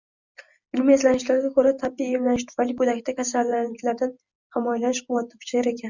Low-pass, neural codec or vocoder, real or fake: 7.2 kHz; none; real